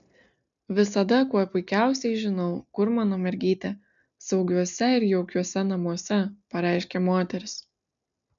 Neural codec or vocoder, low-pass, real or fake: none; 7.2 kHz; real